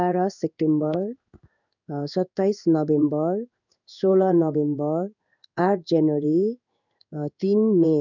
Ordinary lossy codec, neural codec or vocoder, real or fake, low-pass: none; codec, 16 kHz in and 24 kHz out, 1 kbps, XY-Tokenizer; fake; 7.2 kHz